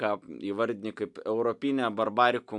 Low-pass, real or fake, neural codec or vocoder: 10.8 kHz; real; none